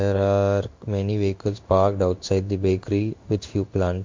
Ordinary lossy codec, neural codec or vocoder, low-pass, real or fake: MP3, 48 kbps; codec, 16 kHz in and 24 kHz out, 1 kbps, XY-Tokenizer; 7.2 kHz; fake